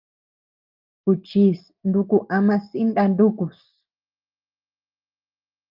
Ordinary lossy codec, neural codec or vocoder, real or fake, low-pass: Opus, 32 kbps; none; real; 5.4 kHz